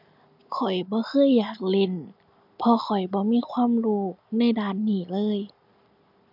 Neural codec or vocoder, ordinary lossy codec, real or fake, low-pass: none; none; real; 5.4 kHz